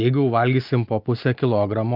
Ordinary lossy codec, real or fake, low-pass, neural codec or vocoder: Opus, 24 kbps; real; 5.4 kHz; none